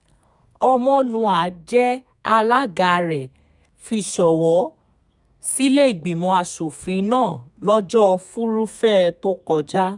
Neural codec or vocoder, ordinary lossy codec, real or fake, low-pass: codec, 32 kHz, 1.9 kbps, SNAC; none; fake; 10.8 kHz